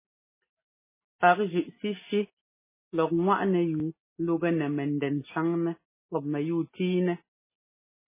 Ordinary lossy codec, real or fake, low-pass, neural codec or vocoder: MP3, 16 kbps; real; 3.6 kHz; none